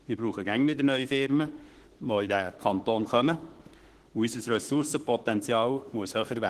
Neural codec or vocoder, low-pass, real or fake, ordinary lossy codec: autoencoder, 48 kHz, 32 numbers a frame, DAC-VAE, trained on Japanese speech; 14.4 kHz; fake; Opus, 16 kbps